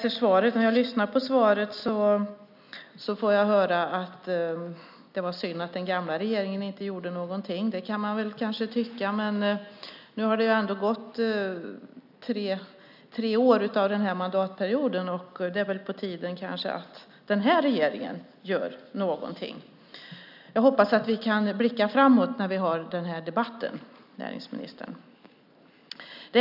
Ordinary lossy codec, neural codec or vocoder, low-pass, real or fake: none; none; 5.4 kHz; real